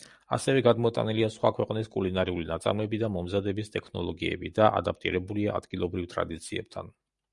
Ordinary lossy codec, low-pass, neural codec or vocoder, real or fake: Opus, 64 kbps; 10.8 kHz; none; real